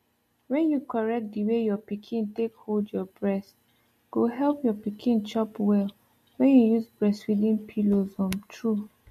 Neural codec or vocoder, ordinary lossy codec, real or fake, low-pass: none; MP3, 64 kbps; real; 14.4 kHz